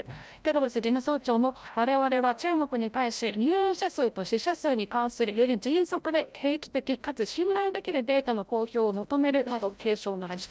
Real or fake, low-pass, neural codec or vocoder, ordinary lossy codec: fake; none; codec, 16 kHz, 0.5 kbps, FreqCodec, larger model; none